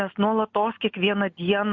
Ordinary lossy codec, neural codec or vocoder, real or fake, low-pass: MP3, 48 kbps; none; real; 7.2 kHz